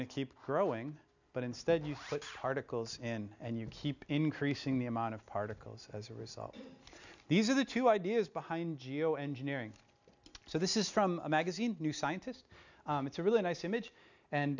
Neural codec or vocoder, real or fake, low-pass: none; real; 7.2 kHz